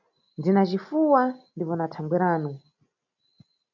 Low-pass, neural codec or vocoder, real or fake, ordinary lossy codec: 7.2 kHz; none; real; MP3, 64 kbps